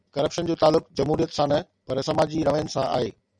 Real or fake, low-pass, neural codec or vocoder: real; 9.9 kHz; none